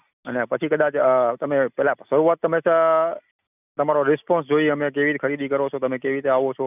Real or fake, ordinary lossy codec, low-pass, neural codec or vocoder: real; none; 3.6 kHz; none